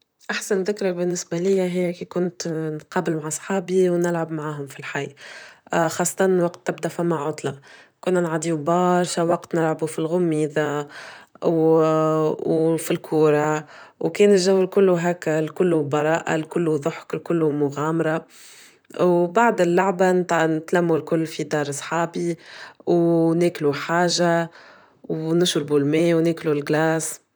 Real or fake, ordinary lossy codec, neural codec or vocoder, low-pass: fake; none; vocoder, 44.1 kHz, 128 mel bands, Pupu-Vocoder; none